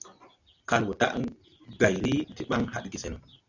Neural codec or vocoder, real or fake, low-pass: none; real; 7.2 kHz